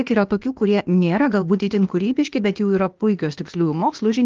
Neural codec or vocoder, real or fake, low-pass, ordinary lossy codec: codec, 16 kHz, 0.8 kbps, ZipCodec; fake; 7.2 kHz; Opus, 32 kbps